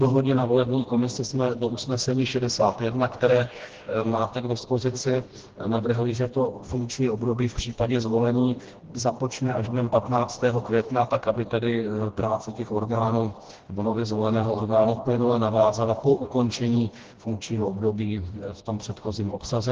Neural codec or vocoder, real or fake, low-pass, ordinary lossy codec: codec, 16 kHz, 1 kbps, FreqCodec, smaller model; fake; 7.2 kHz; Opus, 16 kbps